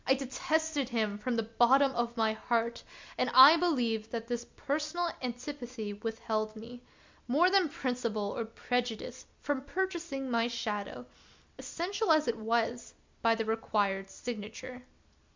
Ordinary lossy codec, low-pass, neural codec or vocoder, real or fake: MP3, 64 kbps; 7.2 kHz; none; real